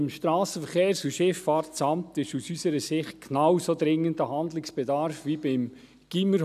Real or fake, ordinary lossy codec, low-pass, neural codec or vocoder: real; none; 14.4 kHz; none